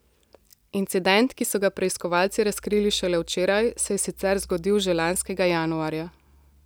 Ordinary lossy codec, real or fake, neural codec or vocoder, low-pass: none; real; none; none